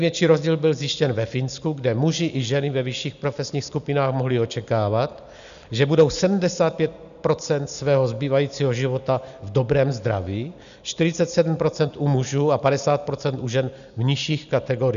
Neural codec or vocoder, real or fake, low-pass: none; real; 7.2 kHz